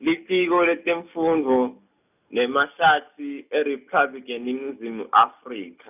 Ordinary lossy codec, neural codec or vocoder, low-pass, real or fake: none; none; 3.6 kHz; real